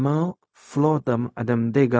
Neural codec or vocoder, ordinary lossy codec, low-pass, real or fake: codec, 16 kHz, 0.4 kbps, LongCat-Audio-Codec; none; none; fake